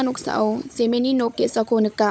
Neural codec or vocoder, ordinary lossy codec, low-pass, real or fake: codec, 16 kHz, 16 kbps, FunCodec, trained on LibriTTS, 50 frames a second; none; none; fake